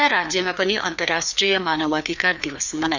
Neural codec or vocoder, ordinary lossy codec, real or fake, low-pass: codec, 16 kHz, 2 kbps, FreqCodec, larger model; none; fake; 7.2 kHz